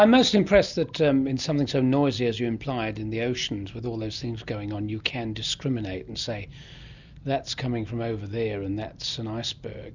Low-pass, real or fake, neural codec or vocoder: 7.2 kHz; real; none